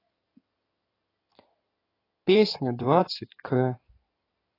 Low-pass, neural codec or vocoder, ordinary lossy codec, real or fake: 5.4 kHz; codec, 16 kHz in and 24 kHz out, 2.2 kbps, FireRedTTS-2 codec; AAC, 24 kbps; fake